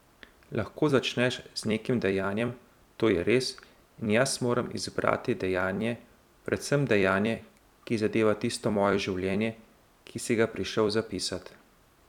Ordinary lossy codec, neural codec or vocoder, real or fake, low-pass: none; vocoder, 44.1 kHz, 128 mel bands every 256 samples, BigVGAN v2; fake; 19.8 kHz